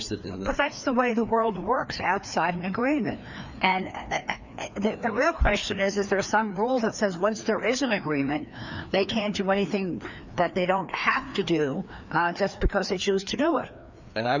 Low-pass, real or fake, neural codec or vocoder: 7.2 kHz; fake; codec, 16 kHz, 2 kbps, FreqCodec, larger model